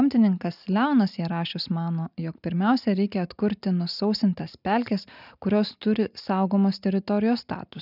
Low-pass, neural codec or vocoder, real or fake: 5.4 kHz; none; real